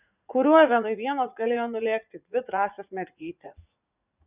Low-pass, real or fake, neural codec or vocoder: 3.6 kHz; fake; vocoder, 44.1 kHz, 80 mel bands, Vocos